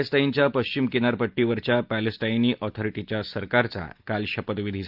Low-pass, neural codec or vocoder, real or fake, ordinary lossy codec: 5.4 kHz; none; real; Opus, 32 kbps